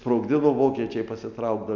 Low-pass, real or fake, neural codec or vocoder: 7.2 kHz; real; none